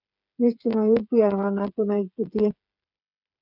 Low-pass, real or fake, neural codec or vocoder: 5.4 kHz; fake; codec, 16 kHz, 8 kbps, FreqCodec, smaller model